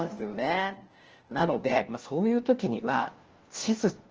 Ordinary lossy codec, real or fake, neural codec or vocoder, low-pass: Opus, 16 kbps; fake; codec, 16 kHz, 1 kbps, FunCodec, trained on LibriTTS, 50 frames a second; 7.2 kHz